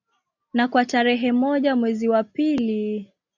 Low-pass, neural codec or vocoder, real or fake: 7.2 kHz; none; real